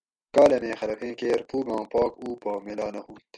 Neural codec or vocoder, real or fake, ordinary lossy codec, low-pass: none; real; MP3, 96 kbps; 9.9 kHz